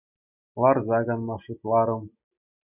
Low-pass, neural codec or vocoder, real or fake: 3.6 kHz; none; real